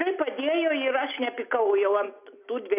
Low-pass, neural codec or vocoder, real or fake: 3.6 kHz; none; real